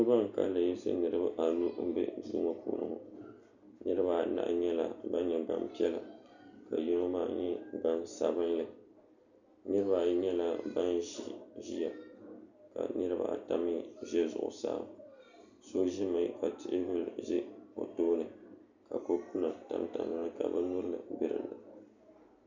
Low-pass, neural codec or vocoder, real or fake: 7.2 kHz; none; real